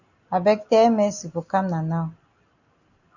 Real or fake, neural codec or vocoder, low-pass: real; none; 7.2 kHz